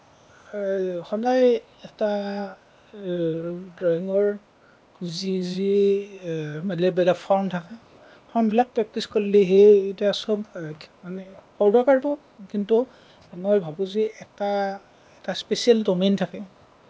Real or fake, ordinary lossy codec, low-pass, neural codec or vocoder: fake; none; none; codec, 16 kHz, 0.8 kbps, ZipCodec